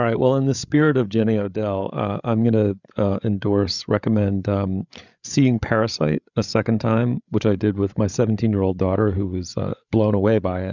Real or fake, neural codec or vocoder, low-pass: fake; codec, 16 kHz, 8 kbps, FreqCodec, larger model; 7.2 kHz